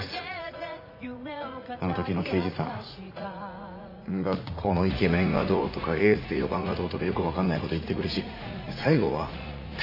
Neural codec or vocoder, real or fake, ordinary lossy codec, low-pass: vocoder, 44.1 kHz, 80 mel bands, Vocos; fake; AAC, 32 kbps; 5.4 kHz